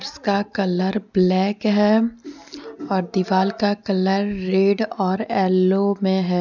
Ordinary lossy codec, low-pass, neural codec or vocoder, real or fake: none; 7.2 kHz; none; real